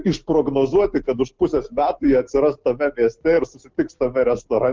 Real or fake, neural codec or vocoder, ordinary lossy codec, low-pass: real; none; Opus, 16 kbps; 7.2 kHz